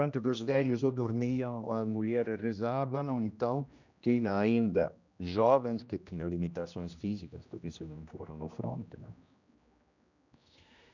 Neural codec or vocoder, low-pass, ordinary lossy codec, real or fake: codec, 16 kHz, 1 kbps, X-Codec, HuBERT features, trained on general audio; 7.2 kHz; none; fake